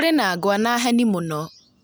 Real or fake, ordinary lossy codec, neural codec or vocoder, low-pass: real; none; none; none